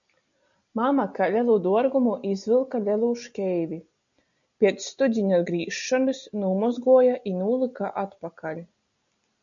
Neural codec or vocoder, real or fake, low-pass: none; real; 7.2 kHz